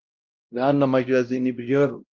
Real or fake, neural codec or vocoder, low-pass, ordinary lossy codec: fake; codec, 16 kHz, 0.5 kbps, X-Codec, WavLM features, trained on Multilingual LibriSpeech; 7.2 kHz; Opus, 32 kbps